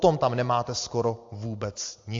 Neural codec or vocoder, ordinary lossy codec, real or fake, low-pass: none; AAC, 48 kbps; real; 7.2 kHz